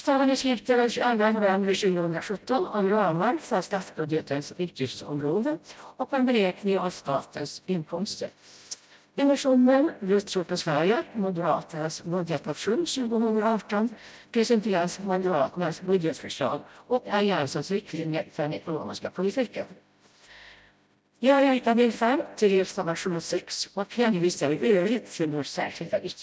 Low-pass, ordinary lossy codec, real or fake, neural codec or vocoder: none; none; fake; codec, 16 kHz, 0.5 kbps, FreqCodec, smaller model